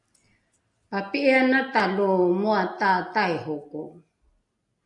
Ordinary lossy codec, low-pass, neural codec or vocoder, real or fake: AAC, 64 kbps; 10.8 kHz; none; real